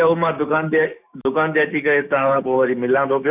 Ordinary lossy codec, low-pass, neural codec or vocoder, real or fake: none; 3.6 kHz; codec, 16 kHz, 6 kbps, DAC; fake